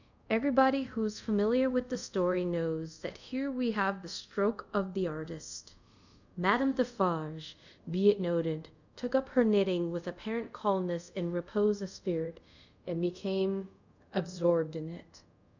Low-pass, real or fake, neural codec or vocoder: 7.2 kHz; fake; codec, 24 kHz, 0.5 kbps, DualCodec